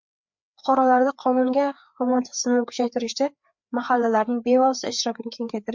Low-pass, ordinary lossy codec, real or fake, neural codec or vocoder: 7.2 kHz; MP3, 64 kbps; fake; codec, 16 kHz, 4 kbps, FreqCodec, larger model